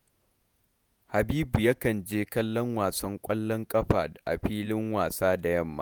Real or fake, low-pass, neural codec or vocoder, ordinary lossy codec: fake; none; vocoder, 48 kHz, 128 mel bands, Vocos; none